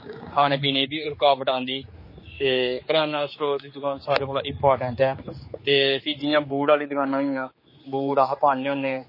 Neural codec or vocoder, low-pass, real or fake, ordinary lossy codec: codec, 16 kHz, 4 kbps, X-Codec, HuBERT features, trained on general audio; 5.4 kHz; fake; MP3, 24 kbps